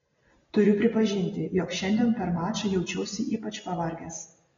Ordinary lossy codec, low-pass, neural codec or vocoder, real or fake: AAC, 24 kbps; 7.2 kHz; none; real